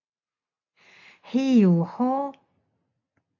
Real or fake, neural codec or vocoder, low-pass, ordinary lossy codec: real; none; 7.2 kHz; AAC, 48 kbps